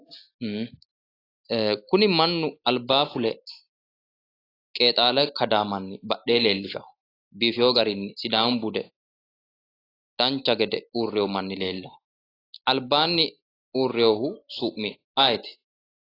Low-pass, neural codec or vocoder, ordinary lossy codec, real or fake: 5.4 kHz; none; AAC, 24 kbps; real